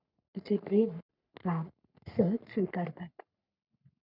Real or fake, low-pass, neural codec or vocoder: fake; 5.4 kHz; codec, 44.1 kHz, 3.4 kbps, Pupu-Codec